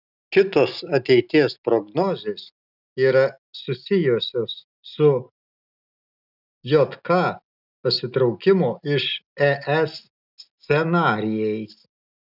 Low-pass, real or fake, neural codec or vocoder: 5.4 kHz; real; none